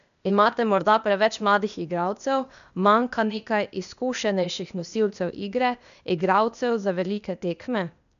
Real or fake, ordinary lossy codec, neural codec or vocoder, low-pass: fake; none; codec, 16 kHz, 0.8 kbps, ZipCodec; 7.2 kHz